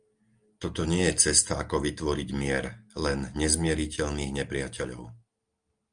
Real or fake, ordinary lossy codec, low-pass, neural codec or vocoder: real; Opus, 32 kbps; 9.9 kHz; none